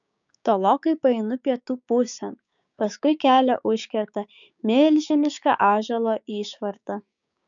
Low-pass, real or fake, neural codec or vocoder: 7.2 kHz; fake; codec, 16 kHz, 6 kbps, DAC